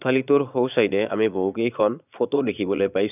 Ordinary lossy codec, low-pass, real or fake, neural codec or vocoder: none; 3.6 kHz; fake; vocoder, 22.05 kHz, 80 mel bands, Vocos